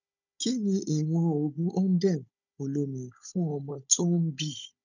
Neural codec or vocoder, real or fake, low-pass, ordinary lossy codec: codec, 16 kHz, 16 kbps, FunCodec, trained on Chinese and English, 50 frames a second; fake; 7.2 kHz; none